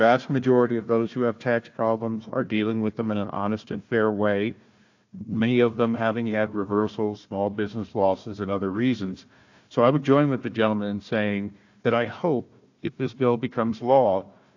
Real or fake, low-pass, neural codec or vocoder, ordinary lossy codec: fake; 7.2 kHz; codec, 16 kHz, 1 kbps, FunCodec, trained on Chinese and English, 50 frames a second; AAC, 48 kbps